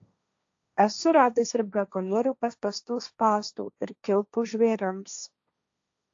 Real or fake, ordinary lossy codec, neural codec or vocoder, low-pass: fake; AAC, 48 kbps; codec, 16 kHz, 1.1 kbps, Voila-Tokenizer; 7.2 kHz